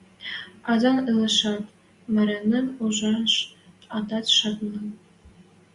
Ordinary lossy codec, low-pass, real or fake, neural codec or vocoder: Opus, 64 kbps; 10.8 kHz; real; none